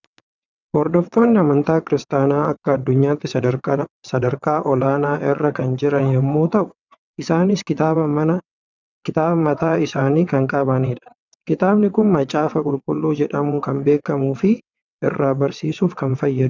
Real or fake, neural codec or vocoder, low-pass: fake; vocoder, 22.05 kHz, 80 mel bands, WaveNeXt; 7.2 kHz